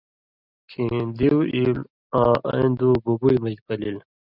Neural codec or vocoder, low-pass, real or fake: none; 5.4 kHz; real